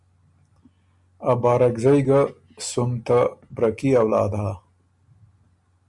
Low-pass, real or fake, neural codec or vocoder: 10.8 kHz; real; none